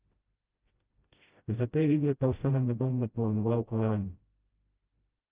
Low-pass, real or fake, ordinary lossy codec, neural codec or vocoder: 3.6 kHz; fake; Opus, 32 kbps; codec, 16 kHz, 0.5 kbps, FreqCodec, smaller model